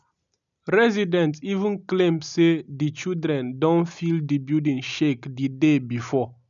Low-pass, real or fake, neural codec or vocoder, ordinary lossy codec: 7.2 kHz; real; none; none